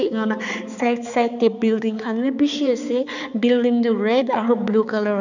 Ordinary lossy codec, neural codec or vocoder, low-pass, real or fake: none; codec, 16 kHz, 4 kbps, X-Codec, HuBERT features, trained on balanced general audio; 7.2 kHz; fake